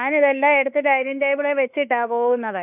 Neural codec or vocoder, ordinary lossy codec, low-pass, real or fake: autoencoder, 48 kHz, 32 numbers a frame, DAC-VAE, trained on Japanese speech; none; 3.6 kHz; fake